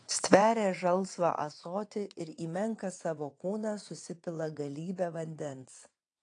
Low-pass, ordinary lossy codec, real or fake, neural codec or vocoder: 9.9 kHz; AAC, 48 kbps; fake; vocoder, 22.05 kHz, 80 mel bands, WaveNeXt